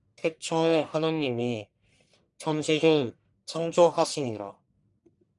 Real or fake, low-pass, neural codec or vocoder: fake; 10.8 kHz; codec, 44.1 kHz, 1.7 kbps, Pupu-Codec